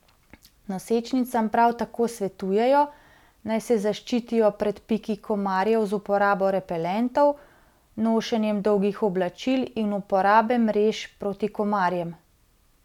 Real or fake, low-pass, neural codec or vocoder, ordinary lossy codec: real; 19.8 kHz; none; none